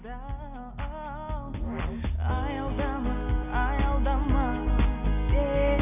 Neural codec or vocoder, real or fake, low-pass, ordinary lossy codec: none; real; 3.6 kHz; none